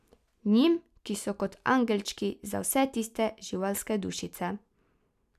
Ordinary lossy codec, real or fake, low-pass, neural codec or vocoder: none; real; 14.4 kHz; none